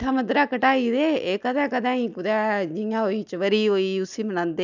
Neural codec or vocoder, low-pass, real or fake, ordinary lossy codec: none; 7.2 kHz; real; none